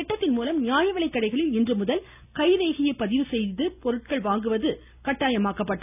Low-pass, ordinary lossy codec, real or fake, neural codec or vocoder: 3.6 kHz; none; real; none